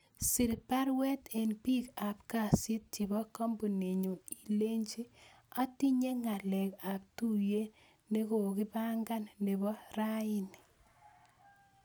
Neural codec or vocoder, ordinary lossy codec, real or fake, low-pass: none; none; real; none